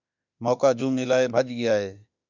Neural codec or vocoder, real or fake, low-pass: autoencoder, 48 kHz, 32 numbers a frame, DAC-VAE, trained on Japanese speech; fake; 7.2 kHz